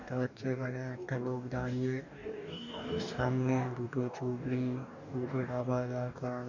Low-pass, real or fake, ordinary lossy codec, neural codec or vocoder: 7.2 kHz; fake; none; codec, 44.1 kHz, 2.6 kbps, DAC